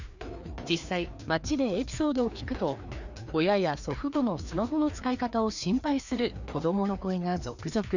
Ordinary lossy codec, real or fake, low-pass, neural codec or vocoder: none; fake; 7.2 kHz; codec, 16 kHz, 2 kbps, FreqCodec, larger model